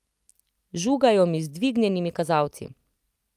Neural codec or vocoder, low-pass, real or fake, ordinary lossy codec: none; 14.4 kHz; real; Opus, 32 kbps